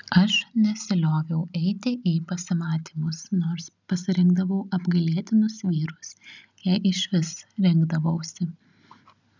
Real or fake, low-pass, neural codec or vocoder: real; 7.2 kHz; none